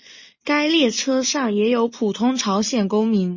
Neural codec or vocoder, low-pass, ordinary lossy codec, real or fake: none; 7.2 kHz; MP3, 32 kbps; real